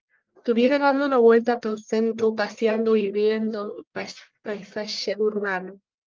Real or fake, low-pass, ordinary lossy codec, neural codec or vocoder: fake; 7.2 kHz; Opus, 32 kbps; codec, 44.1 kHz, 1.7 kbps, Pupu-Codec